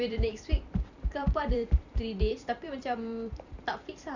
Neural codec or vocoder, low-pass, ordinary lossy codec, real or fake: vocoder, 44.1 kHz, 128 mel bands every 256 samples, BigVGAN v2; 7.2 kHz; none; fake